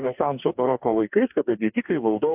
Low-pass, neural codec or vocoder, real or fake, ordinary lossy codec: 3.6 kHz; codec, 44.1 kHz, 2.6 kbps, DAC; fake; AAC, 32 kbps